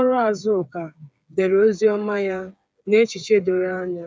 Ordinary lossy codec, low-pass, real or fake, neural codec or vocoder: none; none; fake; codec, 16 kHz, 8 kbps, FreqCodec, smaller model